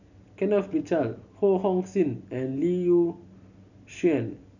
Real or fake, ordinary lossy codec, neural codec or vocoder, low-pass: real; none; none; 7.2 kHz